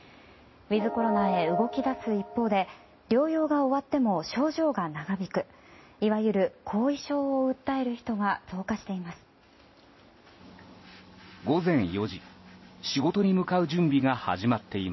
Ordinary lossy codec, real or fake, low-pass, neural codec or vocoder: MP3, 24 kbps; real; 7.2 kHz; none